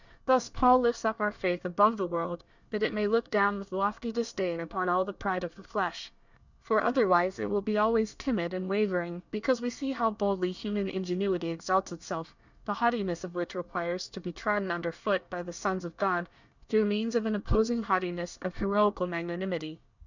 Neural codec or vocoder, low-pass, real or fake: codec, 24 kHz, 1 kbps, SNAC; 7.2 kHz; fake